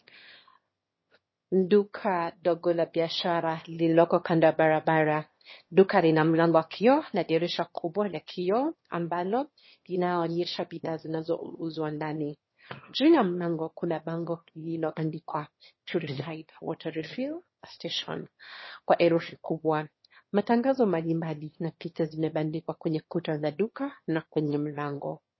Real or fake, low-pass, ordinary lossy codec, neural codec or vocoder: fake; 7.2 kHz; MP3, 24 kbps; autoencoder, 22.05 kHz, a latent of 192 numbers a frame, VITS, trained on one speaker